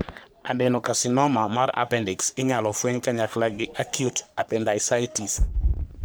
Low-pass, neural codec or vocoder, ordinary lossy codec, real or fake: none; codec, 44.1 kHz, 3.4 kbps, Pupu-Codec; none; fake